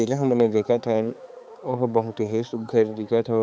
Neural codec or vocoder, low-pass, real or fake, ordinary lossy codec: codec, 16 kHz, 4 kbps, X-Codec, HuBERT features, trained on balanced general audio; none; fake; none